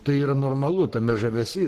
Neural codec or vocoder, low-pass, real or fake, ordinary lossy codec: codec, 44.1 kHz, 3.4 kbps, Pupu-Codec; 14.4 kHz; fake; Opus, 16 kbps